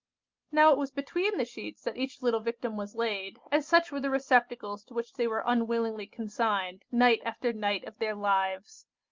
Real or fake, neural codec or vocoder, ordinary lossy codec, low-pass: real; none; Opus, 24 kbps; 7.2 kHz